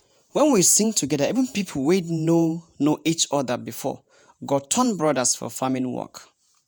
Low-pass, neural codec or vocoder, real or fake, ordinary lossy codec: none; vocoder, 48 kHz, 128 mel bands, Vocos; fake; none